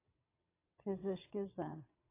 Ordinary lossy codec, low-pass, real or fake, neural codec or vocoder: AAC, 24 kbps; 3.6 kHz; fake; vocoder, 22.05 kHz, 80 mel bands, WaveNeXt